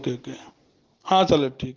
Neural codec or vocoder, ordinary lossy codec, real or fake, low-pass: none; Opus, 16 kbps; real; 7.2 kHz